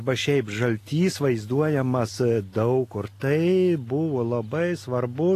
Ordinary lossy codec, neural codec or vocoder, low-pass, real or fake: AAC, 48 kbps; none; 14.4 kHz; real